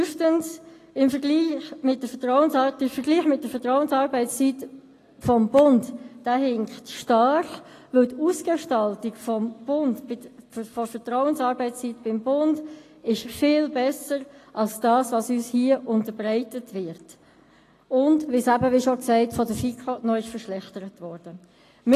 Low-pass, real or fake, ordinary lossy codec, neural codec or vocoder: 14.4 kHz; real; AAC, 48 kbps; none